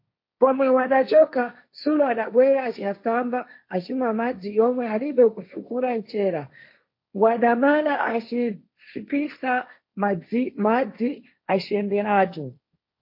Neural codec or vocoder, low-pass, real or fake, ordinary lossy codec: codec, 16 kHz, 1.1 kbps, Voila-Tokenizer; 5.4 kHz; fake; MP3, 32 kbps